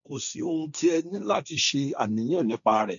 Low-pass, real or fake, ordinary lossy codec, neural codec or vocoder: 7.2 kHz; fake; none; codec, 16 kHz, 1.1 kbps, Voila-Tokenizer